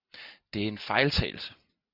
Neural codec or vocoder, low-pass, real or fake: none; 5.4 kHz; real